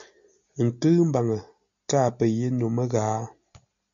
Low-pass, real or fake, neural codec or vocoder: 7.2 kHz; real; none